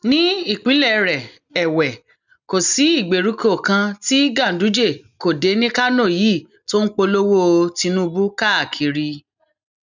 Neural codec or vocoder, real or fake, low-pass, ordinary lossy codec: none; real; 7.2 kHz; none